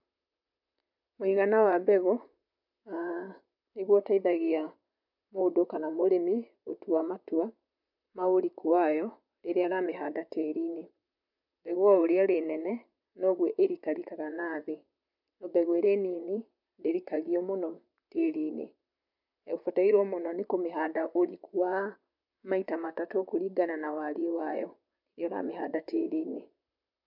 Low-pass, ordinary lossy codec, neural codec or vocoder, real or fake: 5.4 kHz; MP3, 48 kbps; vocoder, 44.1 kHz, 128 mel bands, Pupu-Vocoder; fake